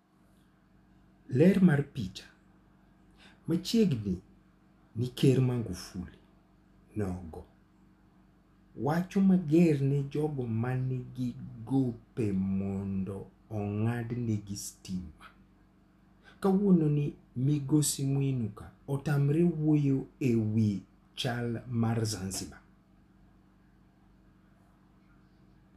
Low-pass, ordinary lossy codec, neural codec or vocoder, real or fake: 14.4 kHz; none; none; real